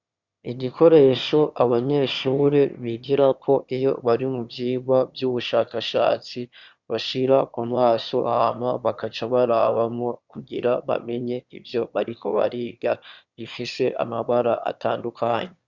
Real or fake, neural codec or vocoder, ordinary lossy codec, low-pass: fake; autoencoder, 22.05 kHz, a latent of 192 numbers a frame, VITS, trained on one speaker; Opus, 64 kbps; 7.2 kHz